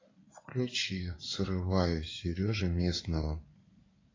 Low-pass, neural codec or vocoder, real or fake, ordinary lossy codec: 7.2 kHz; codec, 16 kHz in and 24 kHz out, 2.2 kbps, FireRedTTS-2 codec; fake; AAC, 32 kbps